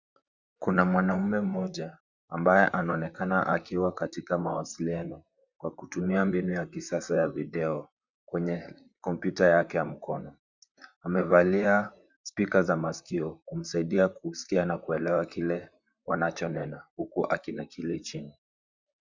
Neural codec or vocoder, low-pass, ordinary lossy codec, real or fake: vocoder, 44.1 kHz, 128 mel bands, Pupu-Vocoder; 7.2 kHz; Opus, 64 kbps; fake